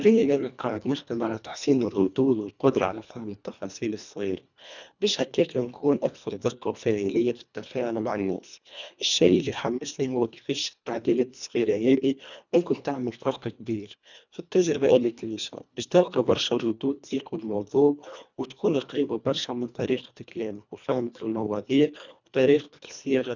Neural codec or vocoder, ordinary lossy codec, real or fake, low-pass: codec, 24 kHz, 1.5 kbps, HILCodec; none; fake; 7.2 kHz